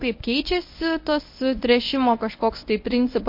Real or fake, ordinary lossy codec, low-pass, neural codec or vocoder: fake; MP3, 32 kbps; 5.4 kHz; codec, 24 kHz, 0.9 kbps, WavTokenizer, medium speech release version 1